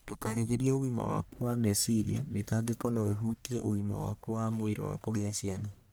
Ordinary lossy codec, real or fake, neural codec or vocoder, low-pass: none; fake; codec, 44.1 kHz, 1.7 kbps, Pupu-Codec; none